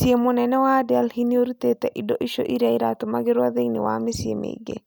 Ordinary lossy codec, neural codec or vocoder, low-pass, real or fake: none; none; none; real